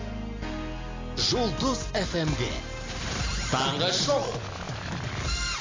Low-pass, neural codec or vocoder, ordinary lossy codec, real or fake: 7.2 kHz; none; AAC, 32 kbps; real